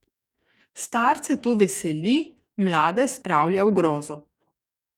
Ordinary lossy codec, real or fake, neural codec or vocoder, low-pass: none; fake; codec, 44.1 kHz, 2.6 kbps, DAC; 19.8 kHz